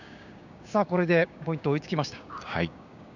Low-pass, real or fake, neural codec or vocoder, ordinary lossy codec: 7.2 kHz; fake; codec, 16 kHz, 6 kbps, DAC; none